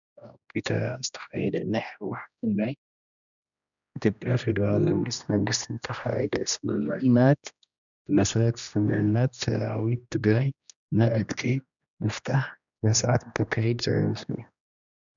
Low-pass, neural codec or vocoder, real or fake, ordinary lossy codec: 7.2 kHz; codec, 16 kHz, 1 kbps, X-Codec, HuBERT features, trained on general audio; fake; MP3, 96 kbps